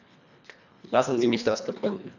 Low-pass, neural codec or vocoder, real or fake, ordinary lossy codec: 7.2 kHz; codec, 24 kHz, 1.5 kbps, HILCodec; fake; none